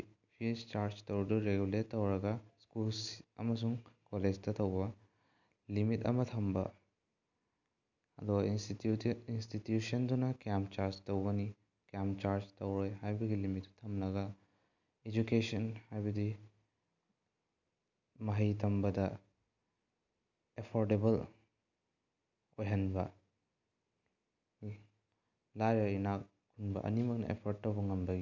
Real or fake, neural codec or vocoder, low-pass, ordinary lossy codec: real; none; 7.2 kHz; none